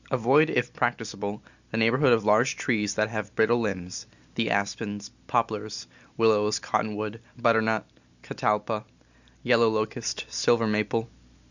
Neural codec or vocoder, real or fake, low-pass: none; real; 7.2 kHz